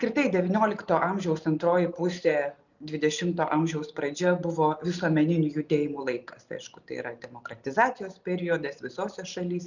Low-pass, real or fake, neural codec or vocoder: 7.2 kHz; real; none